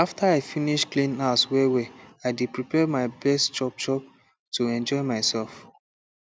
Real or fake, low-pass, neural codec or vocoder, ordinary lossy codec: real; none; none; none